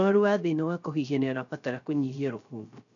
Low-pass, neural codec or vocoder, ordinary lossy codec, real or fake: 7.2 kHz; codec, 16 kHz, 0.3 kbps, FocalCodec; none; fake